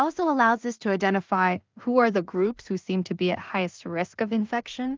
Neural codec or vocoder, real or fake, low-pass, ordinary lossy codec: codec, 16 kHz in and 24 kHz out, 0.4 kbps, LongCat-Audio-Codec, two codebook decoder; fake; 7.2 kHz; Opus, 32 kbps